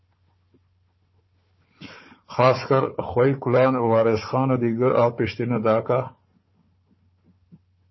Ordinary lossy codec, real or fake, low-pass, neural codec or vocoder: MP3, 24 kbps; fake; 7.2 kHz; codec, 16 kHz, 4 kbps, FunCodec, trained on Chinese and English, 50 frames a second